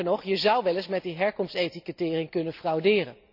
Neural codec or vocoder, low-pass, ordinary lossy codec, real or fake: none; 5.4 kHz; none; real